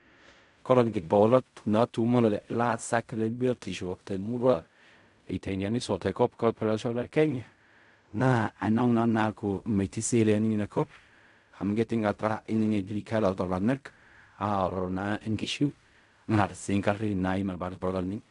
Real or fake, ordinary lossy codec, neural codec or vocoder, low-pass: fake; MP3, 96 kbps; codec, 16 kHz in and 24 kHz out, 0.4 kbps, LongCat-Audio-Codec, fine tuned four codebook decoder; 10.8 kHz